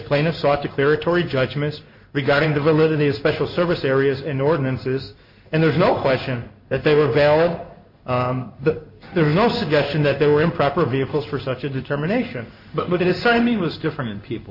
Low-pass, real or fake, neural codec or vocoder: 5.4 kHz; fake; codec, 16 kHz in and 24 kHz out, 1 kbps, XY-Tokenizer